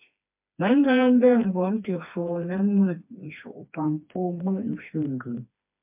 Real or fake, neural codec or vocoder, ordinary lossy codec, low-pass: fake; codec, 16 kHz, 2 kbps, FreqCodec, smaller model; MP3, 32 kbps; 3.6 kHz